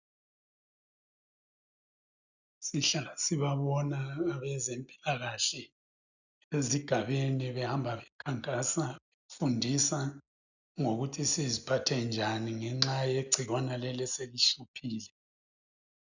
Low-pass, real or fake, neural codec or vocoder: 7.2 kHz; real; none